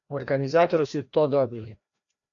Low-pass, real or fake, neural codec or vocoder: 7.2 kHz; fake; codec, 16 kHz, 1 kbps, FreqCodec, larger model